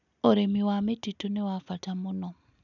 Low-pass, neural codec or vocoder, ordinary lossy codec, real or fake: 7.2 kHz; none; none; real